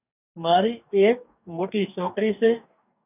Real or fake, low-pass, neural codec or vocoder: fake; 3.6 kHz; codec, 44.1 kHz, 2.6 kbps, DAC